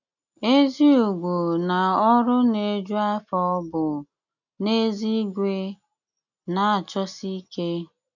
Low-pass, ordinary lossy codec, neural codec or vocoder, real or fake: 7.2 kHz; none; none; real